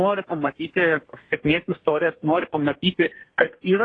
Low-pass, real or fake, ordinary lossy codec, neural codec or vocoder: 9.9 kHz; fake; AAC, 32 kbps; codec, 32 kHz, 1.9 kbps, SNAC